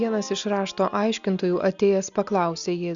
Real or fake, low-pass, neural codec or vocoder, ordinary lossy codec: real; 7.2 kHz; none; Opus, 64 kbps